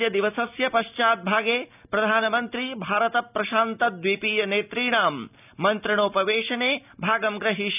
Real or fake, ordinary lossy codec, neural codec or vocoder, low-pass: real; none; none; 3.6 kHz